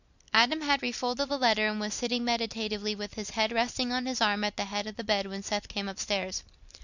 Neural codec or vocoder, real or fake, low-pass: none; real; 7.2 kHz